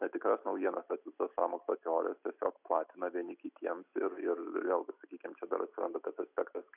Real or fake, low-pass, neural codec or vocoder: real; 3.6 kHz; none